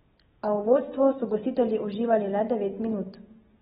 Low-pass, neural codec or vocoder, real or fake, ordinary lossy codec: 19.8 kHz; none; real; AAC, 16 kbps